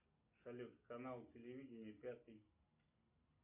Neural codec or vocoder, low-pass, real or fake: none; 3.6 kHz; real